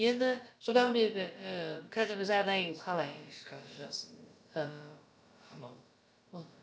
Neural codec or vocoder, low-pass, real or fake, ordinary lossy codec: codec, 16 kHz, about 1 kbps, DyCAST, with the encoder's durations; none; fake; none